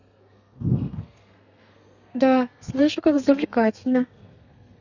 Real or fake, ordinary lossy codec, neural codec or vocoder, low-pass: fake; none; codec, 32 kHz, 1.9 kbps, SNAC; 7.2 kHz